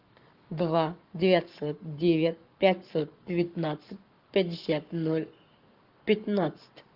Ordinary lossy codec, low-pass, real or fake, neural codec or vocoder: Opus, 32 kbps; 5.4 kHz; fake; codec, 44.1 kHz, 7.8 kbps, Pupu-Codec